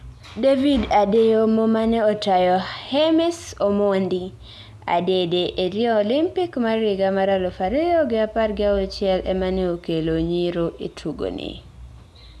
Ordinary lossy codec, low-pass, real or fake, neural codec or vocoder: none; none; real; none